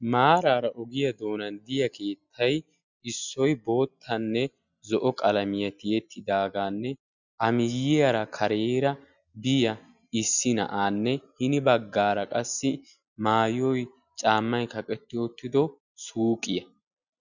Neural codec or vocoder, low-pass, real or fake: none; 7.2 kHz; real